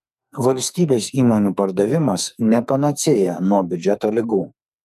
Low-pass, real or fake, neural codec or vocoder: 14.4 kHz; fake; codec, 44.1 kHz, 2.6 kbps, SNAC